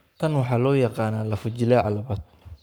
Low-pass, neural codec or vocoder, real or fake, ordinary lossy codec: none; codec, 44.1 kHz, 7.8 kbps, Pupu-Codec; fake; none